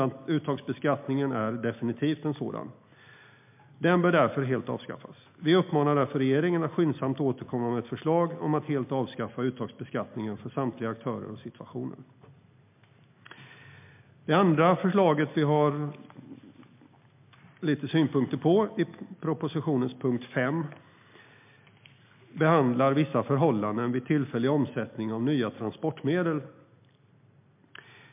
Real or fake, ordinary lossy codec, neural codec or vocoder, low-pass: real; MP3, 32 kbps; none; 3.6 kHz